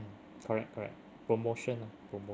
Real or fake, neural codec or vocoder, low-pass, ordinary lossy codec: real; none; none; none